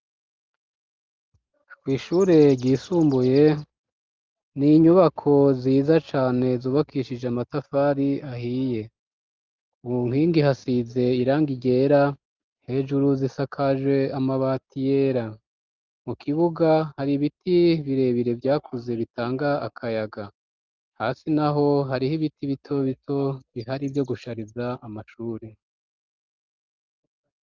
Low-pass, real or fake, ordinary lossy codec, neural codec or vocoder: 7.2 kHz; real; Opus, 32 kbps; none